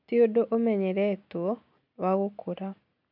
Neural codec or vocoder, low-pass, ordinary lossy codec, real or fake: none; 5.4 kHz; none; real